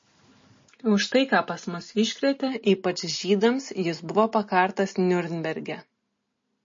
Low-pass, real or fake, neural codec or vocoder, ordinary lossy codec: 7.2 kHz; real; none; MP3, 32 kbps